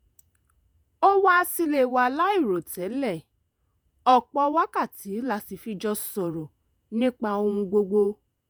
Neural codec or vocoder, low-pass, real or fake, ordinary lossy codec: vocoder, 48 kHz, 128 mel bands, Vocos; none; fake; none